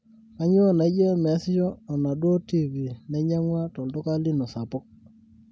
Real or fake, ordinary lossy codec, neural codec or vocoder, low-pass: real; none; none; none